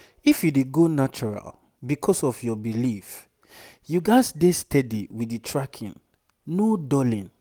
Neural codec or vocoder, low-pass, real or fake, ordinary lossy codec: none; none; real; none